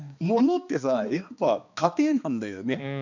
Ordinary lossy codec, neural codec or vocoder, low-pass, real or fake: none; codec, 16 kHz, 2 kbps, X-Codec, HuBERT features, trained on balanced general audio; 7.2 kHz; fake